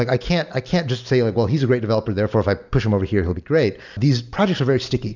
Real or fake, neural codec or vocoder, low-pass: real; none; 7.2 kHz